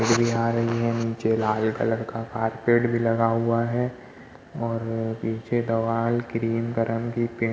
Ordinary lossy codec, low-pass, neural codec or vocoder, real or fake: none; none; none; real